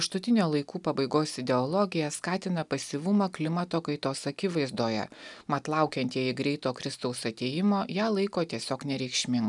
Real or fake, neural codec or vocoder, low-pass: real; none; 10.8 kHz